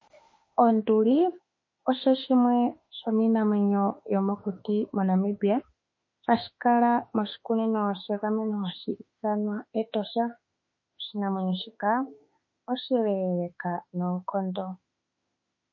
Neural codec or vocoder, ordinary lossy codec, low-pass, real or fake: autoencoder, 48 kHz, 32 numbers a frame, DAC-VAE, trained on Japanese speech; MP3, 32 kbps; 7.2 kHz; fake